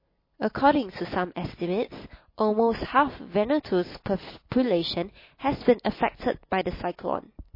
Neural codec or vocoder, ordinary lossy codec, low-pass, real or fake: none; MP3, 24 kbps; 5.4 kHz; real